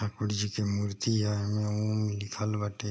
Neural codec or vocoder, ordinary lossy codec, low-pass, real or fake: none; none; none; real